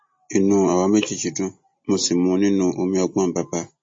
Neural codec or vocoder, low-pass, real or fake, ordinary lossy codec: none; 9.9 kHz; real; MP3, 32 kbps